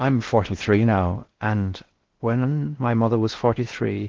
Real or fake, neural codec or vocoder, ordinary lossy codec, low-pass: fake; codec, 16 kHz in and 24 kHz out, 0.6 kbps, FocalCodec, streaming, 4096 codes; Opus, 16 kbps; 7.2 kHz